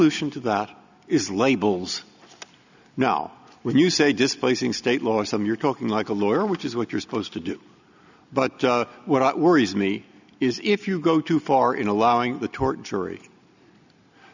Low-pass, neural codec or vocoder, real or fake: 7.2 kHz; none; real